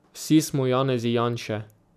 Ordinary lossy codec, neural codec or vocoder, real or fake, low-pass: none; none; real; 14.4 kHz